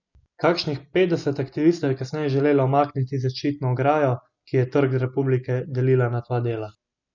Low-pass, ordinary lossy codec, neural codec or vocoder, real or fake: 7.2 kHz; none; none; real